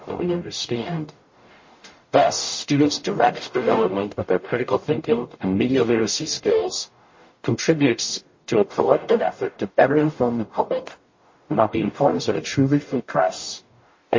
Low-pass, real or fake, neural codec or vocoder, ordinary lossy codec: 7.2 kHz; fake; codec, 44.1 kHz, 0.9 kbps, DAC; MP3, 32 kbps